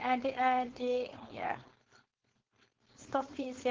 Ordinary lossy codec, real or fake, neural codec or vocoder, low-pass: Opus, 16 kbps; fake; codec, 16 kHz, 4.8 kbps, FACodec; 7.2 kHz